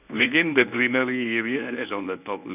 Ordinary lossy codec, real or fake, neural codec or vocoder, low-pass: none; fake; codec, 24 kHz, 0.9 kbps, WavTokenizer, medium speech release version 1; 3.6 kHz